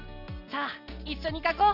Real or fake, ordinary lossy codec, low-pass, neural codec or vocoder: real; none; 5.4 kHz; none